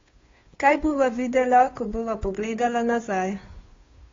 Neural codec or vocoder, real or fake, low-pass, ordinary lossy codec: codec, 16 kHz, 2 kbps, FunCodec, trained on Chinese and English, 25 frames a second; fake; 7.2 kHz; AAC, 32 kbps